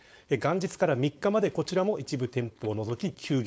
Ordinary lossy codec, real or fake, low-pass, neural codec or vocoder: none; fake; none; codec, 16 kHz, 4.8 kbps, FACodec